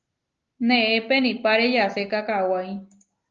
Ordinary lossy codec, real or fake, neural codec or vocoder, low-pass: Opus, 32 kbps; real; none; 7.2 kHz